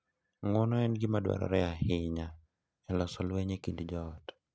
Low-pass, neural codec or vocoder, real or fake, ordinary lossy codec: none; none; real; none